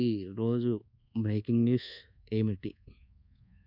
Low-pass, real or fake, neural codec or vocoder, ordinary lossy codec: 5.4 kHz; fake; codec, 24 kHz, 1.2 kbps, DualCodec; none